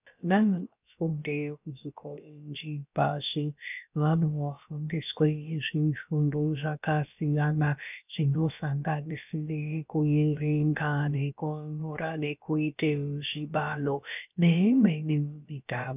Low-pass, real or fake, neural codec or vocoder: 3.6 kHz; fake; codec, 16 kHz, about 1 kbps, DyCAST, with the encoder's durations